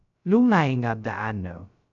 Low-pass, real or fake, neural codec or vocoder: 7.2 kHz; fake; codec, 16 kHz, 0.2 kbps, FocalCodec